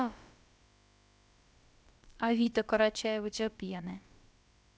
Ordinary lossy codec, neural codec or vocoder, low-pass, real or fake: none; codec, 16 kHz, about 1 kbps, DyCAST, with the encoder's durations; none; fake